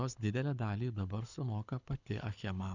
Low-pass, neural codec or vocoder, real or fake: 7.2 kHz; codec, 24 kHz, 6 kbps, HILCodec; fake